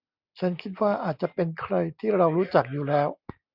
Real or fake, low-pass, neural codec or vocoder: real; 5.4 kHz; none